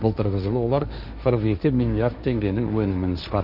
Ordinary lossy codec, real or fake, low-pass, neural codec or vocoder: none; fake; 5.4 kHz; codec, 16 kHz, 1.1 kbps, Voila-Tokenizer